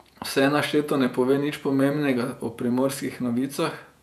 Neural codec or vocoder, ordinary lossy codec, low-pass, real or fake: vocoder, 48 kHz, 128 mel bands, Vocos; none; 14.4 kHz; fake